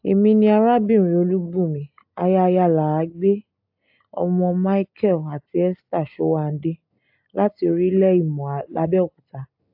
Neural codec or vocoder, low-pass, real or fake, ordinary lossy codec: none; 5.4 kHz; real; AAC, 48 kbps